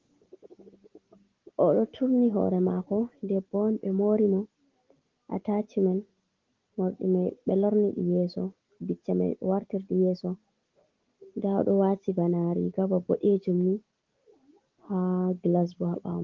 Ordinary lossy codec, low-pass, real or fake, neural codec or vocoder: Opus, 16 kbps; 7.2 kHz; real; none